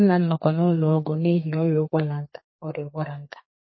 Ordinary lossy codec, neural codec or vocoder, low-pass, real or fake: MP3, 24 kbps; codec, 32 kHz, 1.9 kbps, SNAC; 7.2 kHz; fake